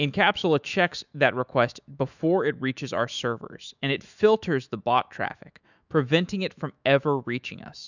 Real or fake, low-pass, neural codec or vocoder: fake; 7.2 kHz; autoencoder, 48 kHz, 128 numbers a frame, DAC-VAE, trained on Japanese speech